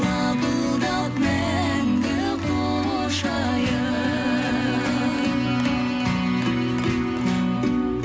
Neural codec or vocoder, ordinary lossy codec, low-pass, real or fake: none; none; none; real